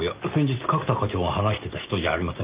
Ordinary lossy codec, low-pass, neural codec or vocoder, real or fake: Opus, 32 kbps; 3.6 kHz; none; real